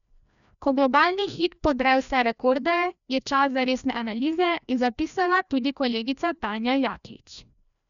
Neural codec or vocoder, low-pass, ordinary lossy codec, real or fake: codec, 16 kHz, 1 kbps, FreqCodec, larger model; 7.2 kHz; none; fake